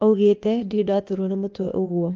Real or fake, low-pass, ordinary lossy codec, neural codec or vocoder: fake; 7.2 kHz; Opus, 24 kbps; codec, 16 kHz, 0.8 kbps, ZipCodec